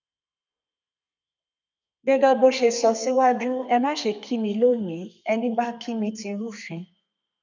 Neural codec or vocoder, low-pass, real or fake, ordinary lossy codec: codec, 32 kHz, 1.9 kbps, SNAC; 7.2 kHz; fake; none